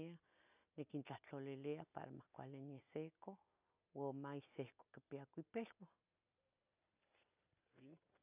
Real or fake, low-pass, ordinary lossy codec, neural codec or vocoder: real; 3.6 kHz; none; none